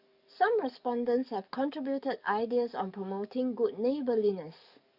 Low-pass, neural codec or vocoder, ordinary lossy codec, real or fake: 5.4 kHz; codec, 44.1 kHz, 7.8 kbps, DAC; none; fake